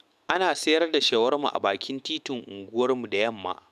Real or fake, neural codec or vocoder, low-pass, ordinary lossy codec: fake; autoencoder, 48 kHz, 128 numbers a frame, DAC-VAE, trained on Japanese speech; 14.4 kHz; none